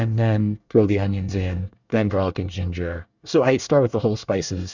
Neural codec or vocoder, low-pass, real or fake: codec, 24 kHz, 1 kbps, SNAC; 7.2 kHz; fake